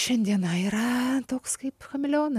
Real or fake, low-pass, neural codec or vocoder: real; 14.4 kHz; none